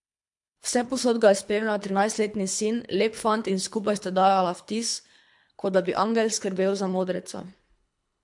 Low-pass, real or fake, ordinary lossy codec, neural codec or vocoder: 10.8 kHz; fake; MP3, 64 kbps; codec, 24 kHz, 3 kbps, HILCodec